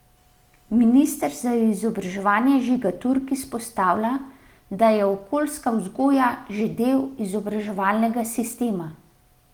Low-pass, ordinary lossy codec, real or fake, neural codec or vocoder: 19.8 kHz; Opus, 24 kbps; real; none